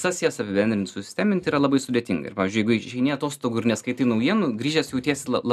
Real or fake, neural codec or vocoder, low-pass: real; none; 14.4 kHz